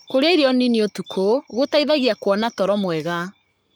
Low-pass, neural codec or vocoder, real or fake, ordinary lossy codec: none; codec, 44.1 kHz, 7.8 kbps, Pupu-Codec; fake; none